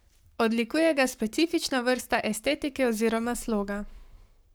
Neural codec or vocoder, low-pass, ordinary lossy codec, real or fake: codec, 44.1 kHz, 7.8 kbps, Pupu-Codec; none; none; fake